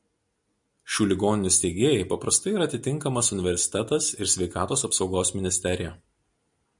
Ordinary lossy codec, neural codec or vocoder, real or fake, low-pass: MP3, 96 kbps; none; real; 10.8 kHz